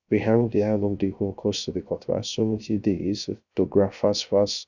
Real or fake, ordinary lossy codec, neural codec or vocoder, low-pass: fake; none; codec, 16 kHz, 0.3 kbps, FocalCodec; 7.2 kHz